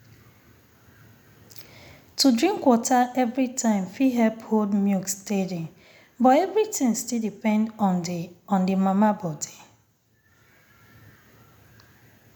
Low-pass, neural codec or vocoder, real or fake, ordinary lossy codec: 19.8 kHz; none; real; none